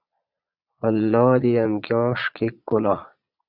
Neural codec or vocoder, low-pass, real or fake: vocoder, 22.05 kHz, 80 mel bands, Vocos; 5.4 kHz; fake